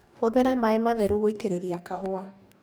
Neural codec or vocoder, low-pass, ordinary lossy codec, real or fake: codec, 44.1 kHz, 2.6 kbps, DAC; none; none; fake